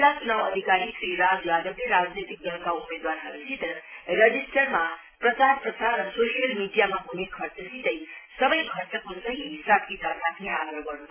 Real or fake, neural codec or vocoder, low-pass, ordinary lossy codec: real; none; 3.6 kHz; MP3, 16 kbps